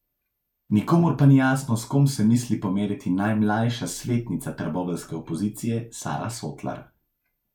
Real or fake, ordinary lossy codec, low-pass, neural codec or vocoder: real; none; 19.8 kHz; none